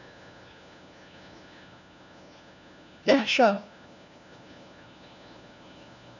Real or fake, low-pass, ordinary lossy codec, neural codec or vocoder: fake; 7.2 kHz; none; codec, 16 kHz, 1 kbps, FunCodec, trained on LibriTTS, 50 frames a second